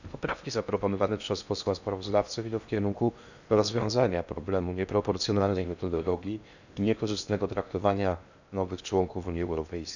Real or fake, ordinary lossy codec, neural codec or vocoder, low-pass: fake; none; codec, 16 kHz in and 24 kHz out, 0.8 kbps, FocalCodec, streaming, 65536 codes; 7.2 kHz